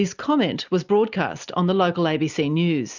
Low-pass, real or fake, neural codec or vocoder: 7.2 kHz; real; none